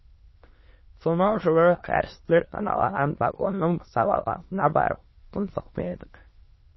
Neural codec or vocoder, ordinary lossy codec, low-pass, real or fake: autoencoder, 22.05 kHz, a latent of 192 numbers a frame, VITS, trained on many speakers; MP3, 24 kbps; 7.2 kHz; fake